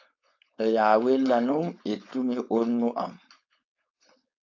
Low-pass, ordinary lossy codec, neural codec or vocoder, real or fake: 7.2 kHz; AAC, 48 kbps; codec, 16 kHz, 4.8 kbps, FACodec; fake